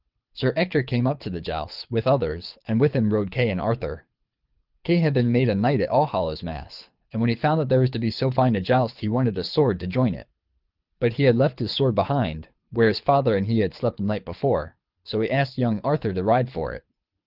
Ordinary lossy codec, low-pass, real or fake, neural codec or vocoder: Opus, 32 kbps; 5.4 kHz; fake; codec, 24 kHz, 6 kbps, HILCodec